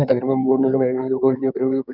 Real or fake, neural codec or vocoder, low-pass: fake; codec, 16 kHz in and 24 kHz out, 2.2 kbps, FireRedTTS-2 codec; 5.4 kHz